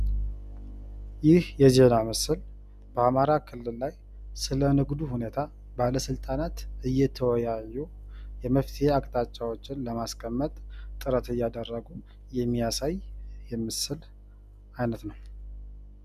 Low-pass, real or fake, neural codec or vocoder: 14.4 kHz; real; none